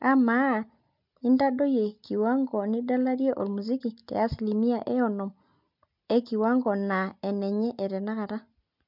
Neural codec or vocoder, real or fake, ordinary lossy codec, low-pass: none; real; MP3, 48 kbps; 5.4 kHz